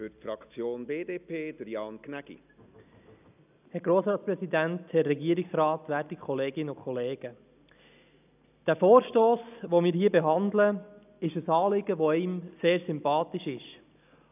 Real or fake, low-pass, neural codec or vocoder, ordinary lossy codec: real; 3.6 kHz; none; none